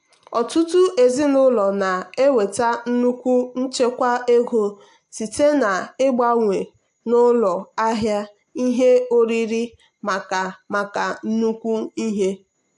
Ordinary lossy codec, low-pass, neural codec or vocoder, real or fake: AAC, 64 kbps; 10.8 kHz; none; real